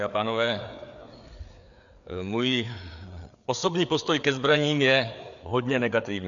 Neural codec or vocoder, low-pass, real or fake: codec, 16 kHz, 4 kbps, FreqCodec, larger model; 7.2 kHz; fake